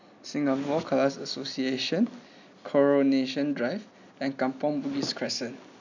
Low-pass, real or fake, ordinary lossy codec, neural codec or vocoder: 7.2 kHz; real; none; none